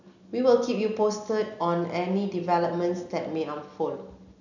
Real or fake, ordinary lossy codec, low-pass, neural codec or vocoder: real; none; 7.2 kHz; none